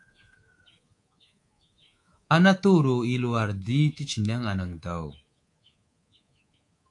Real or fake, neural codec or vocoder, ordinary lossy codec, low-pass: fake; codec, 24 kHz, 3.1 kbps, DualCodec; AAC, 48 kbps; 10.8 kHz